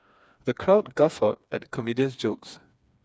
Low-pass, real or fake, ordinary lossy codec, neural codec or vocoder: none; fake; none; codec, 16 kHz, 4 kbps, FreqCodec, smaller model